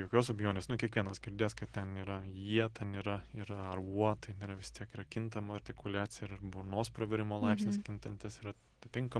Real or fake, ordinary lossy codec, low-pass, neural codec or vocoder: real; Opus, 16 kbps; 9.9 kHz; none